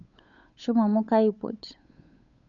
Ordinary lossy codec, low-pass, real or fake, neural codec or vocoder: none; 7.2 kHz; fake; codec, 16 kHz, 8 kbps, FunCodec, trained on Chinese and English, 25 frames a second